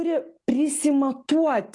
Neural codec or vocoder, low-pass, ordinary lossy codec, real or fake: none; 10.8 kHz; AAC, 48 kbps; real